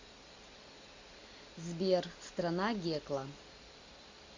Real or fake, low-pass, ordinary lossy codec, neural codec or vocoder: real; 7.2 kHz; MP3, 48 kbps; none